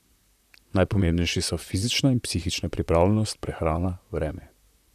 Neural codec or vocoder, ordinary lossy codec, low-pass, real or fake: vocoder, 44.1 kHz, 128 mel bands, Pupu-Vocoder; none; 14.4 kHz; fake